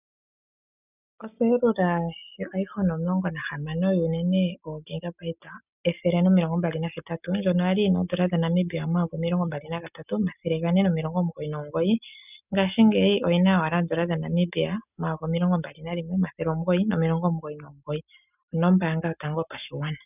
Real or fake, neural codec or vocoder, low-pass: real; none; 3.6 kHz